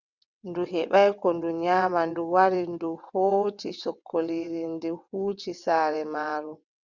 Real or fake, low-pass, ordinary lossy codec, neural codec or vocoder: fake; 7.2 kHz; Opus, 64 kbps; vocoder, 22.05 kHz, 80 mel bands, WaveNeXt